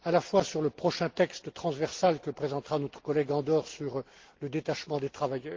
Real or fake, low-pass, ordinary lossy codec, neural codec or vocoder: real; 7.2 kHz; Opus, 16 kbps; none